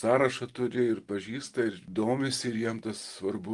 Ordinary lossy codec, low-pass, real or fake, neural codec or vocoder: Opus, 24 kbps; 10.8 kHz; real; none